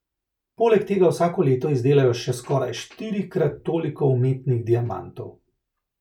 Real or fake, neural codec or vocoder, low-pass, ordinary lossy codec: real; none; 19.8 kHz; none